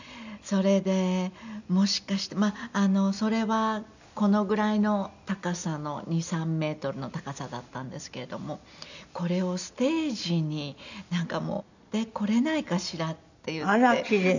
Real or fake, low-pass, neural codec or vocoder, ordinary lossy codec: real; 7.2 kHz; none; none